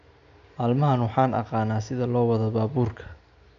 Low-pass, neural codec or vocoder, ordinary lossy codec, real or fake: 7.2 kHz; none; MP3, 96 kbps; real